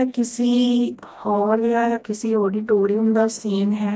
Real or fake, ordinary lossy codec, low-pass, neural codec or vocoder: fake; none; none; codec, 16 kHz, 1 kbps, FreqCodec, smaller model